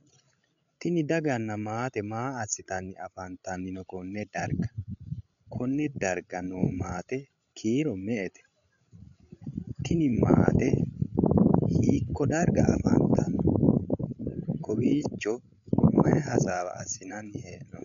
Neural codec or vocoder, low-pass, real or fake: codec, 16 kHz, 16 kbps, FreqCodec, larger model; 7.2 kHz; fake